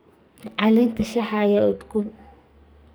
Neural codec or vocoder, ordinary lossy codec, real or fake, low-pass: codec, 44.1 kHz, 2.6 kbps, SNAC; none; fake; none